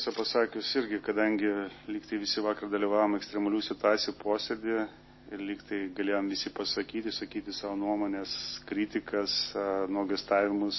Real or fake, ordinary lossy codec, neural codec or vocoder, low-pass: real; MP3, 24 kbps; none; 7.2 kHz